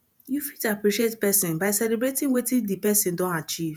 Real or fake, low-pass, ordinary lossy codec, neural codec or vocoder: fake; none; none; vocoder, 48 kHz, 128 mel bands, Vocos